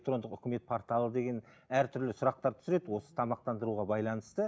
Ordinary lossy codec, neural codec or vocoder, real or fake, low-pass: none; none; real; none